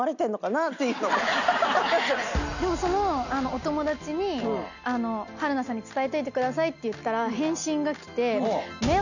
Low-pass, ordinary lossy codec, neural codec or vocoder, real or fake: 7.2 kHz; none; none; real